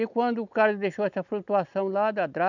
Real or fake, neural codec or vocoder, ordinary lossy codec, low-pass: real; none; none; 7.2 kHz